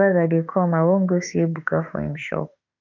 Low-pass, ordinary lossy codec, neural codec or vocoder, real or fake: 7.2 kHz; none; autoencoder, 48 kHz, 32 numbers a frame, DAC-VAE, trained on Japanese speech; fake